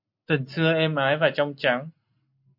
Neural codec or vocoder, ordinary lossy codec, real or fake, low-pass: none; MP3, 48 kbps; real; 5.4 kHz